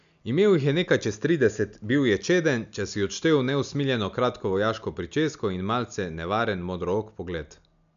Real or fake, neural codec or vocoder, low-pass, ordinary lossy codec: real; none; 7.2 kHz; none